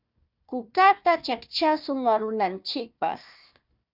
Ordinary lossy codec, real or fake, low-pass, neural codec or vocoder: Opus, 64 kbps; fake; 5.4 kHz; codec, 16 kHz, 1 kbps, FunCodec, trained on Chinese and English, 50 frames a second